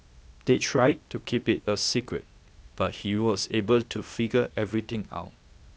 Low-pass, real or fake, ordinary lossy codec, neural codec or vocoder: none; fake; none; codec, 16 kHz, 0.8 kbps, ZipCodec